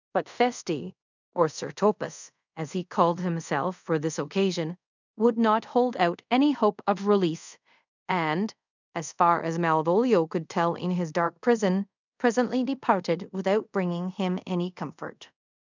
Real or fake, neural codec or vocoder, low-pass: fake; codec, 24 kHz, 0.5 kbps, DualCodec; 7.2 kHz